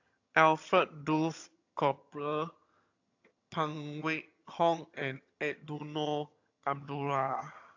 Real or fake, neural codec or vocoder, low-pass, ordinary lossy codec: fake; vocoder, 22.05 kHz, 80 mel bands, HiFi-GAN; 7.2 kHz; AAC, 48 kbps